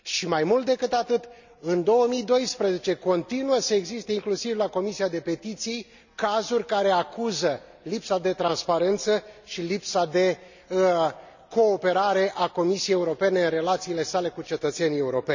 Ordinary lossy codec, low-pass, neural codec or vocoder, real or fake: none; 7.2 kHz; none; real